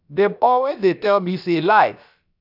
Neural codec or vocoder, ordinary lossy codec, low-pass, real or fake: codec, 16 kHz, about 1 kbps, DyCAST, with the encoder's durations; none; 5.4 kHz; fake